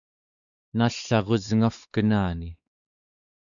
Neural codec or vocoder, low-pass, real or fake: codec, 16 kHz, 4 kbps, X-Codec, WavLM features, trained on Multilingual LibriSpeech; 7.2 kHz; fake